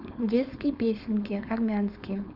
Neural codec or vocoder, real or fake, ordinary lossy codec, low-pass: codec, 16 kHz, 4.8 kbps, FACodec; fake; none; 5.4 kHz